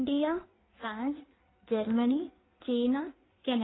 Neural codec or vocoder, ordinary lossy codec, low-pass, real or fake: codec, 16 kHz in and 24 kHz out, 1.1 kbps, FireRedTTS-2 codec; AAC, 16 kbps; 7.2 kHz; fake